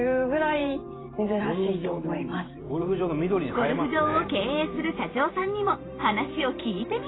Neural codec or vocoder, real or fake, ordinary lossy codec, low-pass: vocoder, 44.1 kHz, 128 mel bands every 512 samples, BigVGAN v2; fake; AAC, 16 kbps; 7.2 kHz